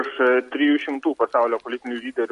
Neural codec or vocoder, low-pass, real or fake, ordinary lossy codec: none; 14.4 kHz; real; MP3, 48 kbps